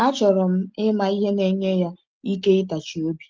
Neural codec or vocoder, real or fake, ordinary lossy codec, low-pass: none; real; Opus, 24 kbps; 7.2 kHz